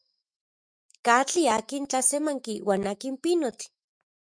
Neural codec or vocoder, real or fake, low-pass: autoencoder, 48 kHz, 128 numbers a frame, DAC-VAE, trained on Japanese speech; fake; 9.9 kHz